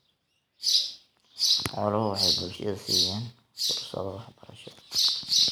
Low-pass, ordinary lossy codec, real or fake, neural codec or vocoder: none; none; real; none